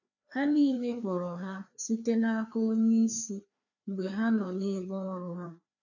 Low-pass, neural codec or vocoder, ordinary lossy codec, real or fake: 7.2 kHz; codec, 16 kHz, 2 kbps, FreqCodec, larger model; none; fake